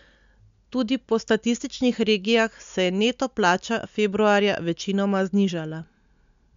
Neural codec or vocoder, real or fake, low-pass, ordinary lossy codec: none; real; 7.2 kHz; MP3, 64 kbps